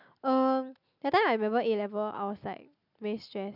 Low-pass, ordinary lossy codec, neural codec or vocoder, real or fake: 5.4 kHz; none; none; real